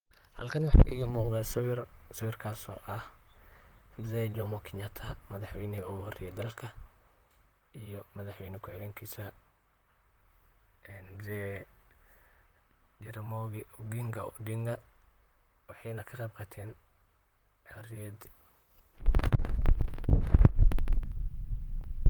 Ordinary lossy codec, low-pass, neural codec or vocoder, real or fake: Opus, 32 kbps; 19.8 kHz; vocoder, 44.1 kHz, 128 mel bands, Pupu-Vocoder; fake